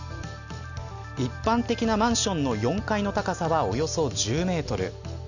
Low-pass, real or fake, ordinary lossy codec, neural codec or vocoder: 7.2 kHz; real; none; none